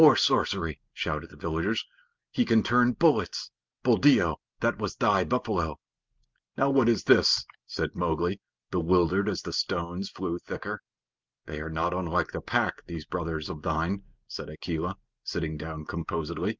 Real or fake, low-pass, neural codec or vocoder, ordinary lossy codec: real; 7.2 kHz; none; Opus, 24 kbps